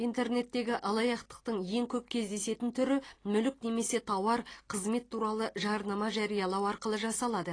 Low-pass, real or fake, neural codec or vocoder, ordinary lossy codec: 9.9 kHz; real; none; AAC, 32 kbps